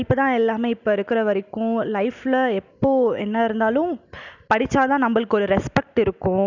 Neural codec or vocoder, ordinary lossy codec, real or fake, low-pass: none; none; real; 7.2 kHz